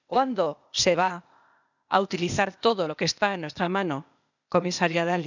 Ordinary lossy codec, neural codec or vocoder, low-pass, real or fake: none; codec, 16 kHz, 0.8 kbps, ZipCodec; 7.2 kHz; fake